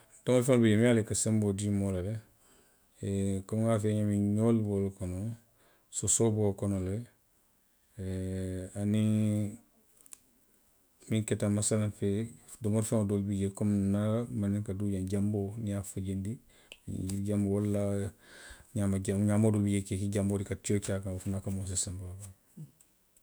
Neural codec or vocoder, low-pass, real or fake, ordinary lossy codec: autoencoder, 48 kHz, 128 numbers a frame, DAC-VAE, trained on Japanese speech; none; fake; none